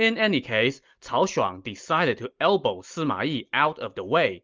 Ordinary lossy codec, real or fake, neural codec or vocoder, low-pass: Opus, 32 kbps; real; none; 7.2 kHz